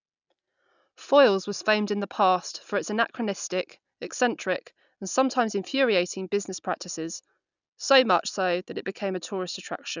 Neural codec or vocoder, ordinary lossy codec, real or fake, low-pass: none; none; real; 7.2 kHz